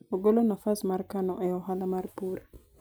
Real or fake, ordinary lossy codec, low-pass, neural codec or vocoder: real; none; none; none